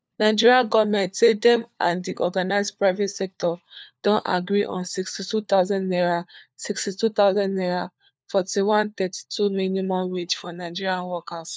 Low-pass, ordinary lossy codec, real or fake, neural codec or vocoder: none; none; fake; codec, 16 kHz, 4 kbps, FunCodec, trained on LibriTTS, 50 frames a second